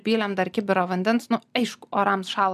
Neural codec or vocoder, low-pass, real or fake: none; 14.4 kHz; real